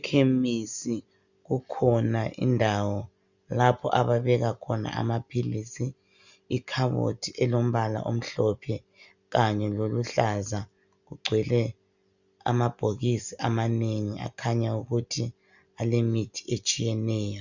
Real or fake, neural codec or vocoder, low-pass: real; none; 7.2 kHz